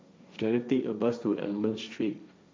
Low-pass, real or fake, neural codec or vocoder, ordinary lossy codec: none; fake; codec, 16 kHz, 1.1 kbps, Voila-Tokenizer; none